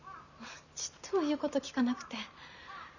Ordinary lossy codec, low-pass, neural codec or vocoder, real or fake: none; 7.2 kHz; vocoder, 44.1 kHz, 128 mel bands every 512 samples, BigVGAN v2; fake